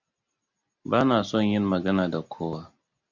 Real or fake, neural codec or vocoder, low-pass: real; none; 7.2 kHz